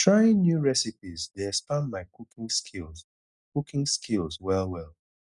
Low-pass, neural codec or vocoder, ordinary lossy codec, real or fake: 10.8 kHz; none; none; real